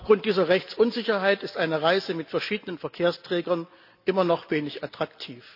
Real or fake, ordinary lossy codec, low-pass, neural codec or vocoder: real; none; 5.4 kHz; none